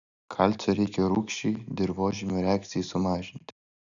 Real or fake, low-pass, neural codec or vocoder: real; 7.2 kHz; none